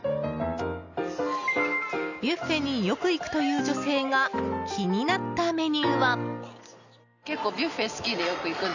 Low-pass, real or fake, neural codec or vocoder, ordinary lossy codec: 7.2 kHz; real; none; none